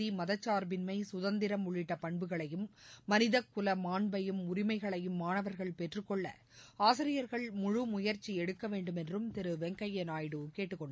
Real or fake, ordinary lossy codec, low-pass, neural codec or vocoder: real; none; none; none